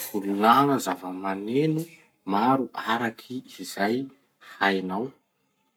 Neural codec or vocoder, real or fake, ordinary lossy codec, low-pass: codec, 44.1 kHz, 7.8 kbps, Pupu-Codec; fake; none; none